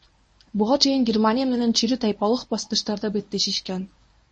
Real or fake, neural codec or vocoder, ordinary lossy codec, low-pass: fake; codec, 24 kHz, 0.9 kbps, WavTokenizer, medium speech release version 1; MP3, 32 kbps; 9.9 kHz